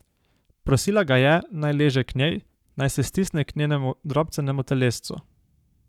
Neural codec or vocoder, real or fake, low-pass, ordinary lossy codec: codec, 44.1 kHz, 7.8 kbps, Pupu-Codec; fake; 19.8 kHz; none